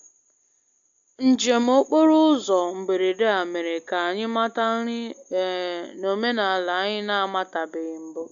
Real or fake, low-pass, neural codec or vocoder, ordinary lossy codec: real; 7.2 kHz; none; AAC, 64 kbps